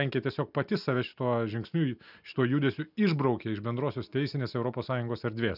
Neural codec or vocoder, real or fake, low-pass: none; real; 5.4 kHz